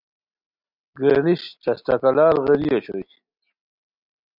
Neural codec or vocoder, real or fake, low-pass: none; real; 5.4 kHz